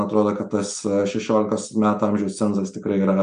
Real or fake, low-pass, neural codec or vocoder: real; 9.9 kHz; none